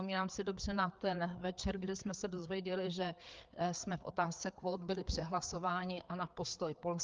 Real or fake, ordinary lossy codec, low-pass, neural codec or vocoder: fake; Opus, 24 kbps; 7.2 kHz; codec, 16 kHz, 4 kbps, FreqCodec, larger model